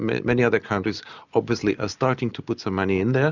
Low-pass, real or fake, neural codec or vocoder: 7.2 kHz; real; none